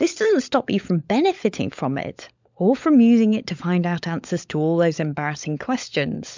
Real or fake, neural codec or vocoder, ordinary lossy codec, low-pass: fake; codec, 16 kHz, 16 kbps, FunCodec, trained on LibriTTS, 50 frames a second; MP3, 64 kbps; 7.2 kHz